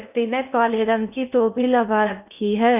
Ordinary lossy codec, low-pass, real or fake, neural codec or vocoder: none; 3.6 kHz; fake; codec, 16 kHz in and 24 kHz out, 0.6 kbps, FocalCodec, streaming, 2048 codes